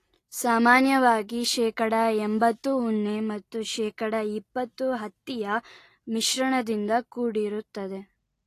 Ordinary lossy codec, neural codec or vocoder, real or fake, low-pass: AAC, 48 kbps; none; real; 14.4 kHz